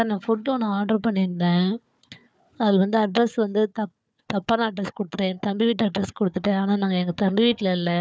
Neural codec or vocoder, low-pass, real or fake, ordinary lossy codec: codec, 16 kHz, 4 kbps, FunCodec, trained on Chinese and English, 50 frames a second; none; fake; none